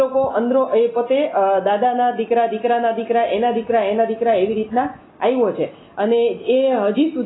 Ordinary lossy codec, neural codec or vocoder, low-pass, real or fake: AAC, 16 kbps; none; 7.2 kHz; real